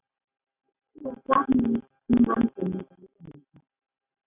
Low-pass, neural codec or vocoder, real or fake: 3.6 kHz; none; real